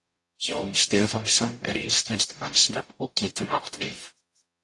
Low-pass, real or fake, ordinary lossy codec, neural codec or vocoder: 10.8 kHz; fake; AAC, 48 kbps; codec, 44.1 kHz, 0.9 kbps, DAC